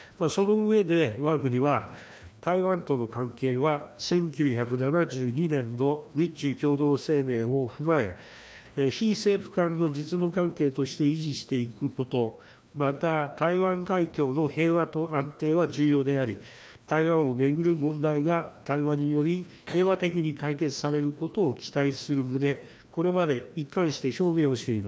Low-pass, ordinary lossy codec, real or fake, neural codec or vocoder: none; none; fake; codec, 16 kHz, 1 kbps, FreqCodec, larger model